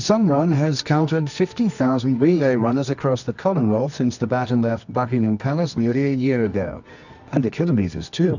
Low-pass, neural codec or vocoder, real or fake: 7.2 kHz; codec, 24 kHz, 0.9 kbps, WavTokenizer, medium music audio release; fake